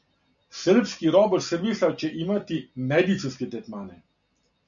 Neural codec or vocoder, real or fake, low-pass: none; real; 7.2 kHz